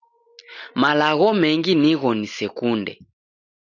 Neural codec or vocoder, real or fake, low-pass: none; real; 7.2 kHz